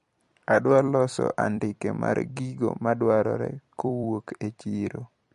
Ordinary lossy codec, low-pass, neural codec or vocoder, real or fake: MP3, 48 kbps; 14.4 kHz; vocoder, 44.1 kHz, 128 mel bands every 256 samples, BigVGAN v2; fake